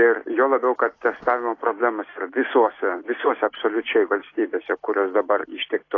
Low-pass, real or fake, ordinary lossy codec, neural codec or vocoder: 7.2 kHz; real; AAC, 32 kbps; none